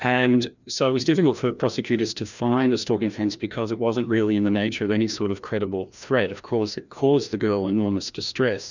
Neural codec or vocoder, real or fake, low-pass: codec, 16 kHz, 1 kbps, FreqCodec, larger model; fake; 7.2 kHz